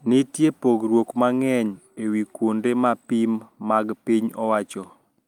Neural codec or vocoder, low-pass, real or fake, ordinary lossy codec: none; 19.8 kHz; real; Opus, 32 kbps